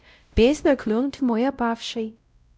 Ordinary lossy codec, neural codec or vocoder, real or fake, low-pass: none; codec, 16 kHz, 0.5 kbps, X-Codec, WavLM features, trained on Multilingual LibriSpeech; fake; none